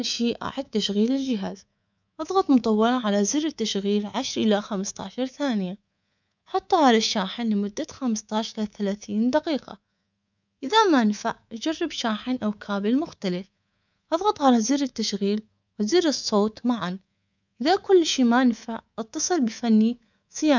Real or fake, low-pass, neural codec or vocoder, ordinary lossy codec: fake; 7.2 kHz; codec, 24 kHz, 3.1 kbps, DualCodec; none